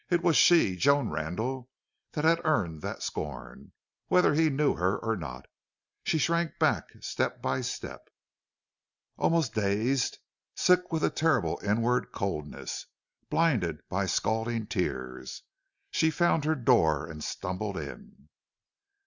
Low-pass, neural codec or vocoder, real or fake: 7.2 kHz; none; real